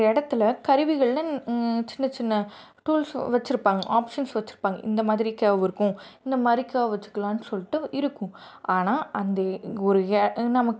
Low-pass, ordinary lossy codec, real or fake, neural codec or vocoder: none; none; real; none